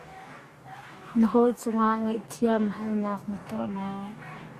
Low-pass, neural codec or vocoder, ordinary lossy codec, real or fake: 14.4 kHz; codec, 44.1 kHz, 2.6 kbps, DAC; Opus, 64 kbps; fake